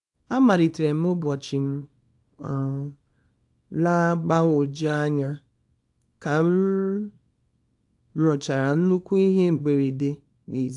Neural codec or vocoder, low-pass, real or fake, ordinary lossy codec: codec, 24 kHz, 0.9 kbps, WavTokenizer, small release; 10.8 kHz; fake; AAC, 64 kbps